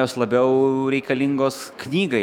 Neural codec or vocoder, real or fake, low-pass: autoencoder, 48 kHz, 128 numbers a frame, DAC-VAE, trained on Japanese speech; fake; 19.8 kHz